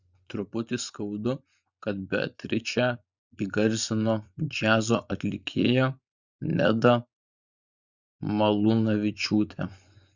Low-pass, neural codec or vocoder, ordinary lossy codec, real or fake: 7.2 kHz; vocoder, 24 kHz, 100 mel bands, Vocos; Opus, 64 kbps; fake